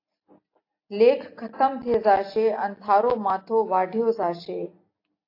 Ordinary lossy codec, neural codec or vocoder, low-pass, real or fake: AAC, 32 kbps; none; 5.4 kHz; real